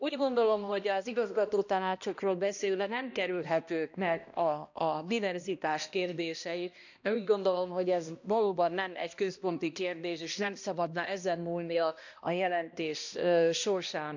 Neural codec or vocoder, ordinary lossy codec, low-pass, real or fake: codec, 16 kHz, 1 kbps, X-Codec, HuBERT features, trained on balanced general audio; none; 7.2 kHz; fake